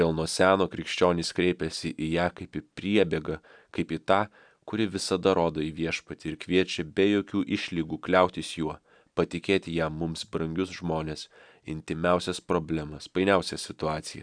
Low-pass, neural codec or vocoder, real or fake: 9.9 kHz; none; real